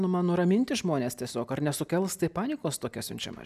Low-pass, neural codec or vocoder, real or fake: 14.4 kHz; none; real